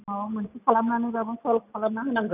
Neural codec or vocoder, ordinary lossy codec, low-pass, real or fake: none; none; 3.6 kHz; real